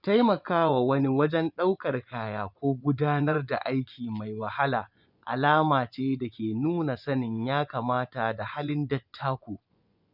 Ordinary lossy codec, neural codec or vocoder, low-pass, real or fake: none; vocoder, 24 kHz, 100 mel bands, Vocos; 5.4 kHz; fake